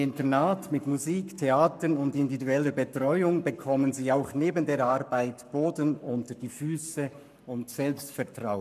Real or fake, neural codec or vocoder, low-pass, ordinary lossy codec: fake; codec, 44.1 kHz, 7.8 kbps, Pupu-Codec; 14.4 kHz; none